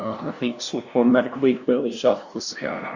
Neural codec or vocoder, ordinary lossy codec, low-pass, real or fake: codec, 16 kHz, 0.5 kbps, FunCodec, trained on LibriTTS, 25 frames a second; Opus, 64 kbps; 7.2 kHz; fake